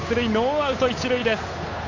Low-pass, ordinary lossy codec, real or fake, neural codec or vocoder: 7.2 kHz; AAC, 48 kbps; fake; codec, 16 kHz, 8 kbps, FunCodec, trained on Chinese and English, 25 frames a second